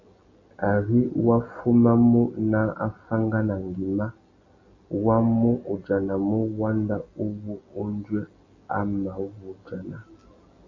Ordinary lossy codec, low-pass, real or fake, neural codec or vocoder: MP3, 32 kbps; 7.2 kHz; real; none